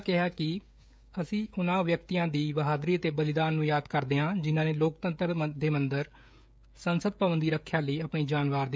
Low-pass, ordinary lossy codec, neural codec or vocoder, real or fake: none; none; codec, 16 kHz, 16 kbps, FreqCodec, smaller model; fake